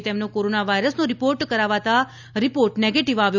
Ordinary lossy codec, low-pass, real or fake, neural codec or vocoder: none; 7.2 kHz; real; none